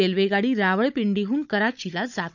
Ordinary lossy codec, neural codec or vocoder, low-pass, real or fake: none; codec, 16 kHz, 16 kbps, FunCodec, trained on Chinese and English, 50 frames a second; 7.2 kHz; fake